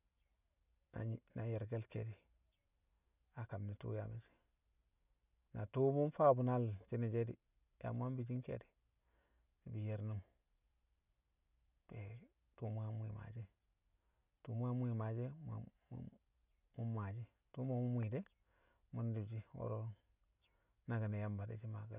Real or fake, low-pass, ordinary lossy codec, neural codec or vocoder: real; 3.6 kHz; none; none